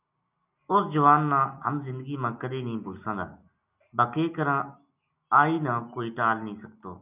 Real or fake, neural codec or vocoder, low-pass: real; none; 3.6 kHz